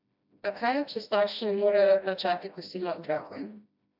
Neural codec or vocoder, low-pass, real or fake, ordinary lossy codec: codec, 16 kHz, 1 kbps, FreqCodec, smaller model; 5.4 kHz; fake; AAC, 48 kbps